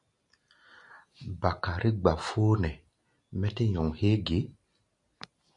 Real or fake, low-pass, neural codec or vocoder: real; 10.8 kHz; none